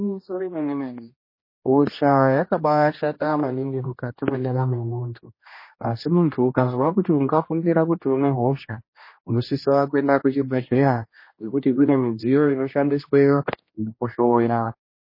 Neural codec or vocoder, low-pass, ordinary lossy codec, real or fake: codec, 16 kHz, 1 kbps, X-Codec, HuBERT features, trained on general audio; 5.4 kHz; MP3, 24 kbps; fake